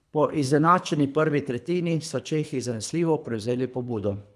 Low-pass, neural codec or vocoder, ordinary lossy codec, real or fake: none; codec, 24 kHz, 3 kbps, HILCodec; none; fake